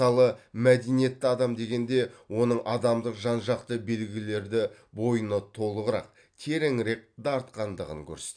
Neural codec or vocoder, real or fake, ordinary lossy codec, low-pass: none; real; AAC, 64 kbps; 9.9 kHz